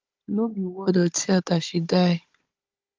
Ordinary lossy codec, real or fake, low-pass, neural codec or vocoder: Opus, 32 kbps; fake; 7.2 kHz; codec, 16 kHz, 16 kbps, FunCodec, trained on Chinese and English, 50 frames a second